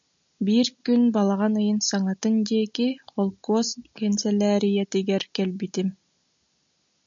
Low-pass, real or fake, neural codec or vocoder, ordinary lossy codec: 7.2 kHz; real; none; MP3, 64 kbps